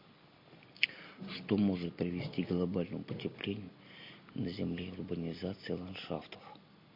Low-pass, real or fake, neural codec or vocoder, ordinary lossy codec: 5.4 kHz; real; none; MP3, 32 kbps